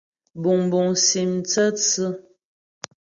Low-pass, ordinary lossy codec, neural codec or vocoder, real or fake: 7.2 kHz; Opus, 64 kbps; none; real